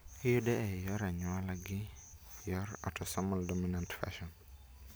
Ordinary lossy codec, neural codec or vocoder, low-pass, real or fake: none; none; none; real